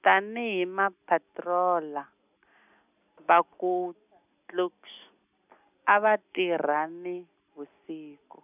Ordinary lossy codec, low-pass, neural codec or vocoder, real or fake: none; 3.6 kHz; none; real